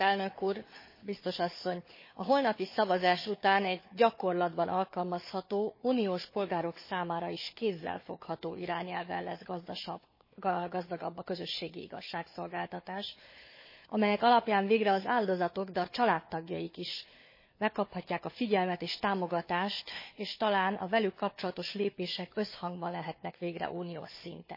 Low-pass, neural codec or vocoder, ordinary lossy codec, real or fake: 5.4 kHz; codec, 16 kHz, 4 kbps, FunCodec, trained on Chinese and English, 50 frames a second; MP3, 24 kbps; fake